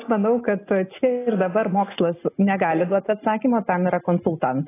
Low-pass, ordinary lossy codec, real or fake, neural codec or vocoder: 3.6 kHz; AAC, 16 kbps; real; none